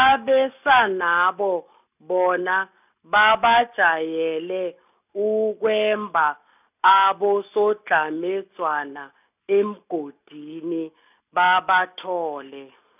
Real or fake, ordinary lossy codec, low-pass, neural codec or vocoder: real; none; 3.6 kHz; none